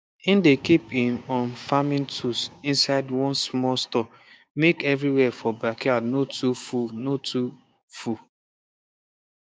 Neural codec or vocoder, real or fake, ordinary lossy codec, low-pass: codec, 16 kHz, 6 kbps, DAC; fake; none; none